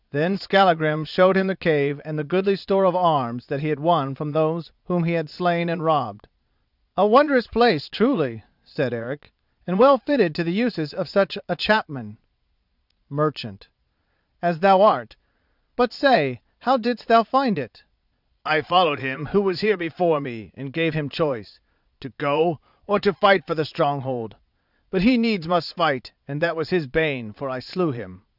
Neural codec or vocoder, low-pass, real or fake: vocoder, 22.05 kHz, 80 mel bands, Vocos; 5.4 kHz; fake